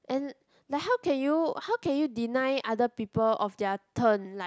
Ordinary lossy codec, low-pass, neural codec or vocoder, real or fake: none; none; none; real